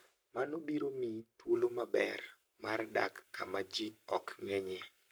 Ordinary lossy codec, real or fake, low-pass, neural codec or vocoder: none; fake; none; codec, 44.1 kHz, 7.8 kbps, Pupu-Codec